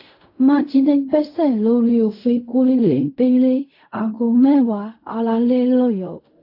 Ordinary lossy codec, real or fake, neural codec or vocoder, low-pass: AAC, 32 kbps; fake; codec, 16 kHz in and 24 kHz out, 0.4 kbps, LongCat-Audio-Codec, fine tuned four codebook decoder; 5.4 kHz